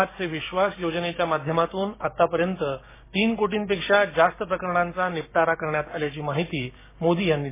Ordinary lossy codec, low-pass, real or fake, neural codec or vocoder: MP3, 16 kbps; 3.6 kHz; fake; codec, 16 kHz, 6 kbps, DAC